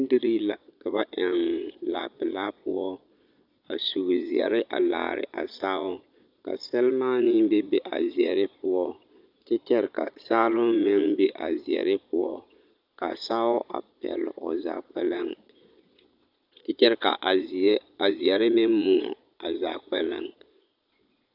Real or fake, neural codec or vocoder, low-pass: fake; vocoder, 22.05 kHz, 80 mel bands, Vocos; 5.4 kHz